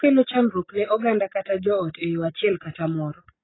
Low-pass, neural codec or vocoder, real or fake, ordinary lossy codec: 7.2 kHz; none; real; AAC, 16 kbps